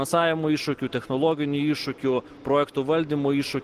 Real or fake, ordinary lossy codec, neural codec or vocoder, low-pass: real; Opus, 16 kbps; none; 14.4 kHz